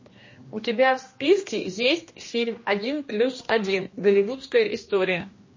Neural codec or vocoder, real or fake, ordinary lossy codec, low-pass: codec, 16 kHz, 1 kbps, X-Codec, HuBERT features, trained on general audio; fake; MP3, 32 kbps; 7.2 kHz